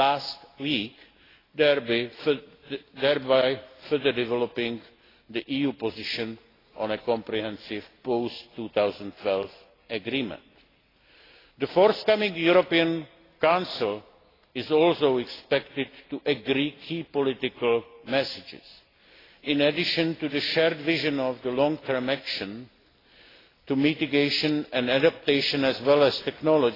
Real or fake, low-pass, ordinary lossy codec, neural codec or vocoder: real; 5.4 kHz; AAC, 24 kbps; none